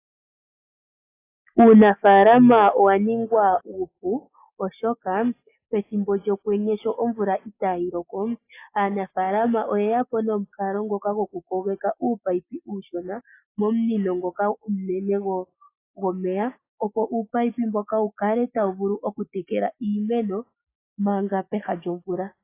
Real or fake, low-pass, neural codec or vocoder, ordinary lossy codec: real; 3.6 kHz; none; AAC, 24 kbps